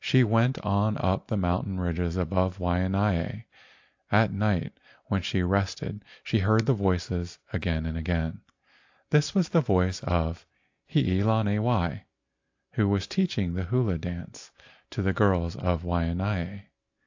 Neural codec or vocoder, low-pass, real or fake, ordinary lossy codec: none; 7.2 kHz; real; AAC, 48 kbps